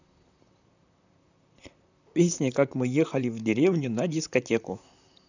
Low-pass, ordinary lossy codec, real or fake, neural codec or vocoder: 7.2 kHz; none; fake; vocoder, 44.1 kHz, 128 mel bands, Pupu-Vocoder